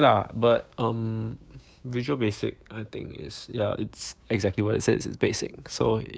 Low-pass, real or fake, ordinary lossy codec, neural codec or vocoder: none; fake; none; codec, 16 kHz, 6 kbps, DAC